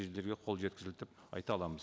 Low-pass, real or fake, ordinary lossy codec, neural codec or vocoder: none; real; none; none